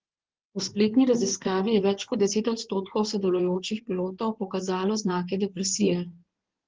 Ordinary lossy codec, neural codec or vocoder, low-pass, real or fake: Opus, 16 kbps; codec, 16 kHz in and 24 kHz out, 2.2 kbps, FireRedTTS-2 codec; 7.2 kHz; fake